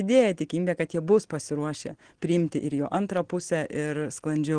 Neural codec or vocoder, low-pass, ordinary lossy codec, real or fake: none; 9.9 kHz; Opus, 16 kbps; real